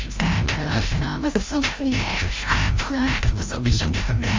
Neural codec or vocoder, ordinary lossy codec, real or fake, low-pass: codec, 16 kHz, 0.5 kbps, FreqCodec, larger model; Opus, 32 kbps; fake; 7.2 kHz